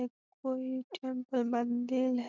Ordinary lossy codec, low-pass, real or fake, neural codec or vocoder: none; 7.2 kHz; real; none